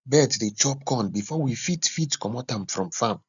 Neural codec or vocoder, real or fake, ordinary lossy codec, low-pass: none; real; none; 7.2 kHz